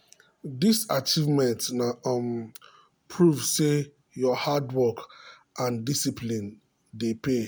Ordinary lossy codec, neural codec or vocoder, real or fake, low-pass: none; none; real; none